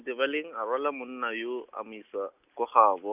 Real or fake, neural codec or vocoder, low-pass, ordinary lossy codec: real; none; 3.6 kHz; none